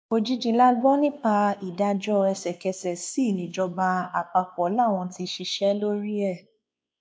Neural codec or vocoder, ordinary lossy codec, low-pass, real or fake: codec, 16 kHz, 2 kbps, X-Codec, WavLM features, trained on Multilingual LibriSpeech; none; none; fake